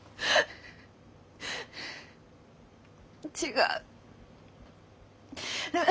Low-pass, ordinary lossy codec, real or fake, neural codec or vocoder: none; none; real; none